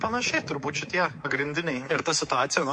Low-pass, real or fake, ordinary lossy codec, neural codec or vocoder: 10.8 kHz; fake; MP3, 48 kbps; codec, 24 kHz, 3.1 kbps, DualCodec